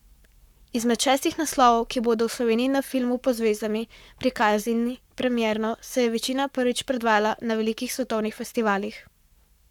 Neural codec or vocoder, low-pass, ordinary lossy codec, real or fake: codec, 44.1 kHz, 7.8 kbps, Pupu-Codec; 19.8 kHz; none; fake